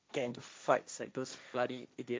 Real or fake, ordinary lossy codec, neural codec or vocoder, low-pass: fake; none; codec, 16 kHz, 1.1 kbps, Voila-Tokenizer; none